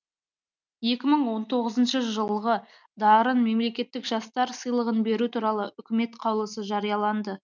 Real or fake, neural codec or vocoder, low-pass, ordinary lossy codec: real; none; 7.2 kHz; none